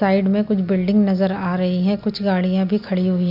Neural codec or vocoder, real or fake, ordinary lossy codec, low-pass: none; real; none; 5.4 kHz